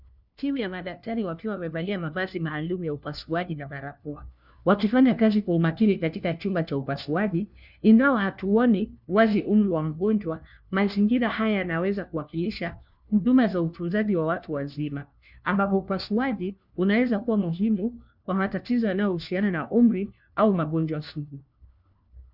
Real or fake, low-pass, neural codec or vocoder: fake; 5.4 kHz; codec, 16 kHz, 1 kbps, FunCodec, trained on LibriTTS, 50 frames a second